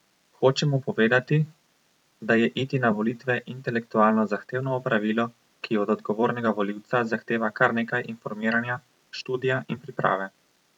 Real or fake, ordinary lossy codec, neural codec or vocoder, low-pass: fake; none; vocoder, 44.1 kHz, 128 mel bands every 256 samples, BigVGAN v2; 19.8 kHz